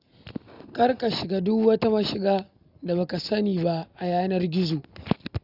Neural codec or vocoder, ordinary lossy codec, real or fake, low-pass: none; none; real; 5.4 kHz